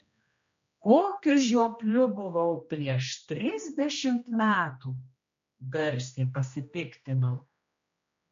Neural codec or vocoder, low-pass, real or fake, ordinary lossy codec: codec, 16 kHz, 1 kbps, X-Codec, HuBERT features, trained on general audio; 7.2 kHz; fake; MP3, 48 kbps